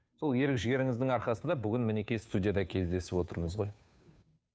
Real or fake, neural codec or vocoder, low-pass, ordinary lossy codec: fake; codec, 16 kHz, 2 kbps, FunCodec, trained on Chinese and English, 25 frames a second; none; none